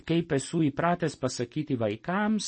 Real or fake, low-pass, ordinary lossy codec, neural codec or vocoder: real; 9.9 kHz; MP3, 32 kbps; none